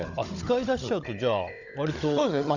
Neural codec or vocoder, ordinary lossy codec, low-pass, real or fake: codec, 16 kHz, 16 kbps, FunCodec, trained on Chinese and English, 50 frames a second; none; 7.2 kHz; fake